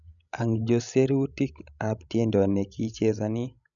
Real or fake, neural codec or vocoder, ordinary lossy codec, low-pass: fake; codec, 16 kHz, 16 kbps, FreqCodec, larger model; none; 7.2 kHz